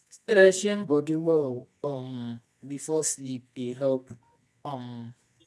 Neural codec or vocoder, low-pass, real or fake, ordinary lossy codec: codec, 24 kHz, 0.9 kbps, WavTokenizer, medium music audio release; none; fake; none